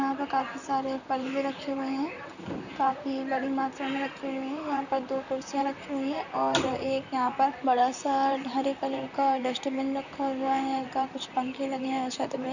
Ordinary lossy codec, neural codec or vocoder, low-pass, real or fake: none; vocoder, 44.1 kHz, 128 mel bands, Pupu-Vocoder; 7.2 kHz; fake